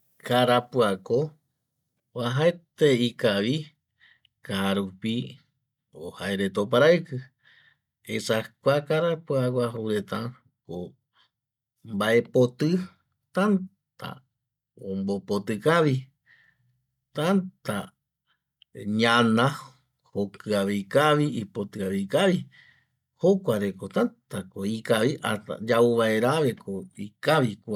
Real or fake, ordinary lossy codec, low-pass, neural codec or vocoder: real; none; 19.8 kHz; none